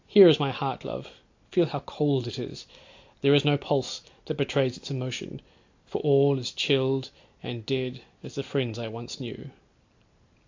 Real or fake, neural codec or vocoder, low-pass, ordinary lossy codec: real; none; 7.2 kHz; AAC, 48 kbps